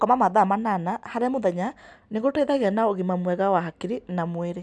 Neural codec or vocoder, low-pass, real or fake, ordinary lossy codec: none; none; real; none